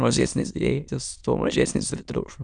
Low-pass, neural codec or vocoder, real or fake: 9.9 kHz; autoencoder, 22.05 kHz, a latent of 192 numbers a frame, VITS, trained on many speakers; fake